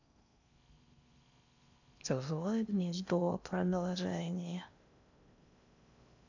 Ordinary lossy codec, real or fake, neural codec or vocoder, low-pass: none; fake; codec, 16 kHz in and 24 kHz out, 0.6 kbps, FocalCodec, streaming, 4096 codes; 7.2 kHz